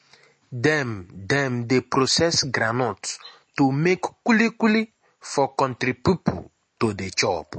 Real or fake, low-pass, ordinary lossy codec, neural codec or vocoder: real; 10.8 kHz; MP3, 32 kbps; none